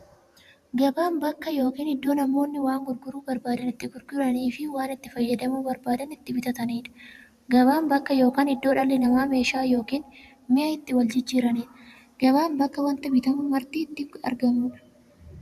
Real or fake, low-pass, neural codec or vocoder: fake; 14.4 kHz; vocoder, 44.1 kHz, 128 mel bands every 512 samples, BigVGAN v2